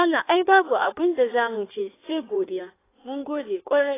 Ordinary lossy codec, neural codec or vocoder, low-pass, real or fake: AAC, 16 kbps; codec, 16 kHz in and 24 kHz out, 1.1 kbps, FireRedTTS-2 codec; 3.6 kHz; fake